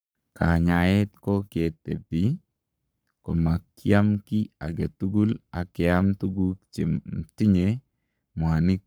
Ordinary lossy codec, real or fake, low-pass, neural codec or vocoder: none; fake; none; codec, 44.1 kHz, 7.8 kbps, Pupu-Codec